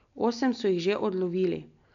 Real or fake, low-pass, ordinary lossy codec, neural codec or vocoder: real; 7.2 kHz; none; none